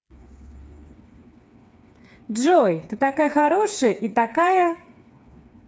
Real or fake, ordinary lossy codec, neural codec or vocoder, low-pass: fake; none; codec, 16 kHz, 4 kbps, FreqCodec, smaller model; none